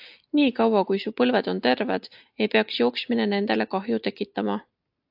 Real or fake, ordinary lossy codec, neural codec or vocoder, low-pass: real; AAC, 48 kbps; none; 5.4 kHz